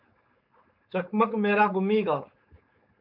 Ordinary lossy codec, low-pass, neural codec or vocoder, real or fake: MP3, 48 kbps; 5.4 kHz; codec, 16 kHz, 4.8 kbps, FACodec; fake